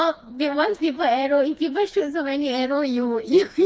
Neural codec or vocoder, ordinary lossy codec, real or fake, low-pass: codec, 16 kHz, 2 kbps, FreqCodec, smaller model; none; fake; none